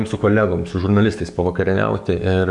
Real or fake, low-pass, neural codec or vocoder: fake; 10.8 kHz; codec, 44.1 kHz, 7.8 kbps, DAC